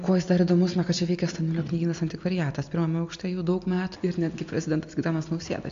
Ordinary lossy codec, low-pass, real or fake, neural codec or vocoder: AAC, 64 kbps; 7.2 kHz; real; none